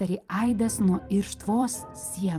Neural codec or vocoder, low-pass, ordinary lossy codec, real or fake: vocoder, 44.1 kHz, 128 mel bands every 256 samples, BigVGAN v2; 14.4 kHz; Opus, 32 kbps; fake